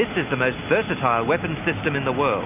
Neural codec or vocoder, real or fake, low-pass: none; real; 3.6 kHz